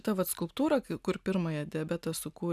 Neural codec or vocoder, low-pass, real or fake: none; 14.4 kHz; real